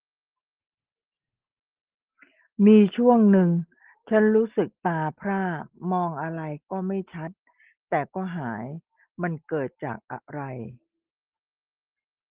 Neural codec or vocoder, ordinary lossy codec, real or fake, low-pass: none; Opus, 16 kbps; real; 3.6 kHz